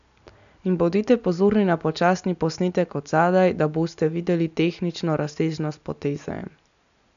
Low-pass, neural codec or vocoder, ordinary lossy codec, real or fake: 7.2 kHz; none; none; real